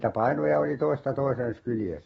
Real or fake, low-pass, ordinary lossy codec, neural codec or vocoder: fake; 19.8 kHz; AAC, 24 kbps; autoencoder, 48 kHz, 128 numbers a frame, DAC-VAE, trained on Japanese speech